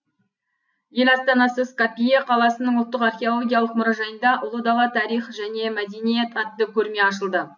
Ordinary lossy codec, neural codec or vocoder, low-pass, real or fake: none; none; 7.2 kHz; real